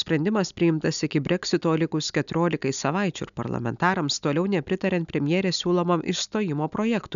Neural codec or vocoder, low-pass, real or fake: none; 7.2 kHz; real